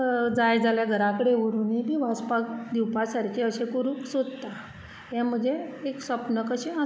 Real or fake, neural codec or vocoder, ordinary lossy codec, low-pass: real; none; none; none